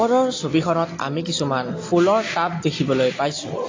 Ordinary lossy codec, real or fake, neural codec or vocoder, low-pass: AAC, 32 kbps; real; none; 7.2 kHz